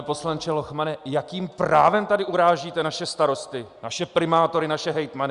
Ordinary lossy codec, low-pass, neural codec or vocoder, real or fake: Opus, 64 kbps; 10.8 kHz; none; real